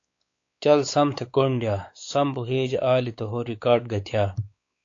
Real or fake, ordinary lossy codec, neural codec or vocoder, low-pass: fake; AAC, 48 kbps; codec, 16 kHz, 4 kbps, X-Codec, WavLM features, trained on Multilingual LibriSpeech; 7.2 kHz